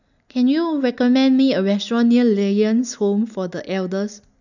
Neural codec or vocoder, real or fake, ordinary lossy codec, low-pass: none; real; none; 7.2 kHz